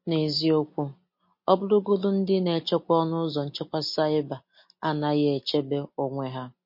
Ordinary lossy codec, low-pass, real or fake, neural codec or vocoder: MP3, 32 kbps; 5.4 kHz; real; none